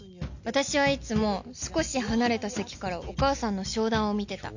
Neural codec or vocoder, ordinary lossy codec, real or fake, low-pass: none; none; real; 7.2 kHz